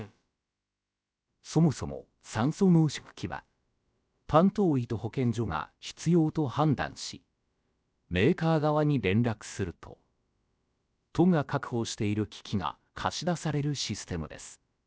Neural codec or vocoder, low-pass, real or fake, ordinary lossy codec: codec, 16 kHz, about 1 kbps, DyCAST, with the encoder's durations; none; fake; none